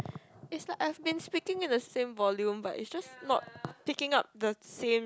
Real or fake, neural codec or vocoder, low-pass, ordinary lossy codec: real; none; none; none